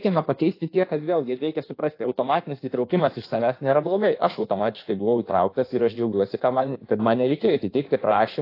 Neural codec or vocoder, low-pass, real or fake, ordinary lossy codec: codec, 16 kHz in and 24 kHz out, 1.1 kbps, FireRedTTS-2 codec; 5.4 kHz; fake; AAC, 32 kbps